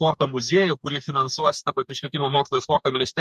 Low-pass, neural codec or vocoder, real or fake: 14.4 kHz; codec, 44.1 kHz, 2.6 kbps, DAC; fake